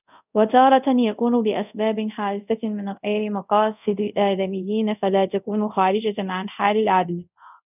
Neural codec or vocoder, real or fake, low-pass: codec, 24 kHz, 0.5 kbps, DualCodec; fake; 3.6 kHz